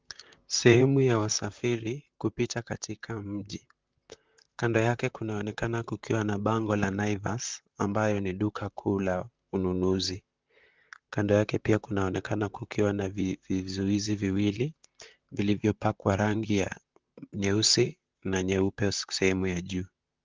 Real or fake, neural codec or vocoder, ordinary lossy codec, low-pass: fake; vocoder, 44.1 kHz, 128 mel bands, Pupu-Vocoder; Opus, 24 kbps; 7.2 kHz